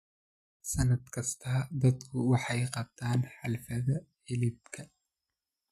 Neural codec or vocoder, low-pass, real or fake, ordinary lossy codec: none; 14.4 kHz; real; none